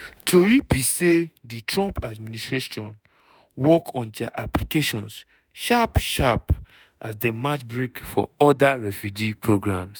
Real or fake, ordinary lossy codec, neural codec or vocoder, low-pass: fake; none; autoencoder, 48 kHz, 32 numbers a frame, DAC-VAE, trained on Japanese speech; none